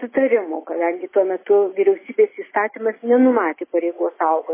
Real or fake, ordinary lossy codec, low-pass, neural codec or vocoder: real; MP3, 16 kbps; 3.6 kHz; none